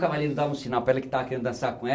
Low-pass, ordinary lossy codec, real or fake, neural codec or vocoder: none; none; real; none